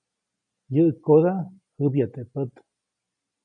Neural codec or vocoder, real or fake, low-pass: none; real; 9.9 kHz